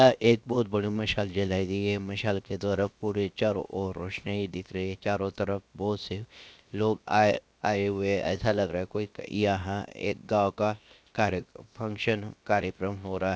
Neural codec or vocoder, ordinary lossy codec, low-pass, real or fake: codec, 16 kHz, 0.7 kbps, FocalCodec; none; none; fake